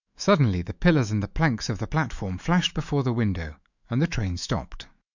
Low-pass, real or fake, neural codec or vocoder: 7.2 kHz; fake; autoencoder, 48 kHz, 128 numbers a frame, DAC-VAE, trained on Japanese speech